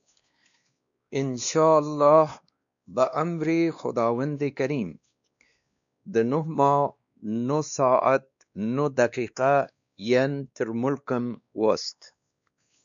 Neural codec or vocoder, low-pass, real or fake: codec, 16 kHz, 2 kbps, X-Codec, WavLM features, trained on Multilingual LibriSpeech; 7.2 kHz; fake